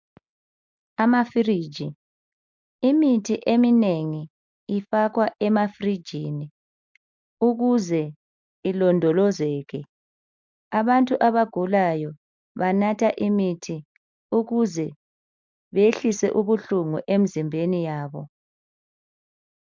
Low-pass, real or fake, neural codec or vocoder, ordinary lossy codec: 7.2 kHz; real; none; MP3, 64 kbps